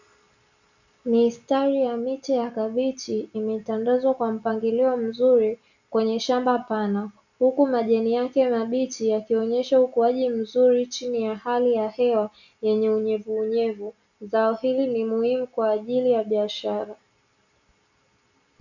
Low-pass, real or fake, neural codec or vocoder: 7.2 kHz; real; none